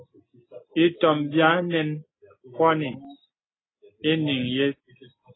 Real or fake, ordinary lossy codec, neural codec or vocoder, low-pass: real; AAC, 16 kbps; none; 7.2 kHz